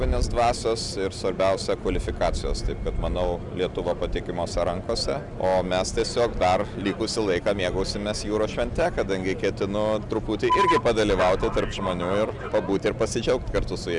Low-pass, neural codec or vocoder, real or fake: 10.8 kHz; none; real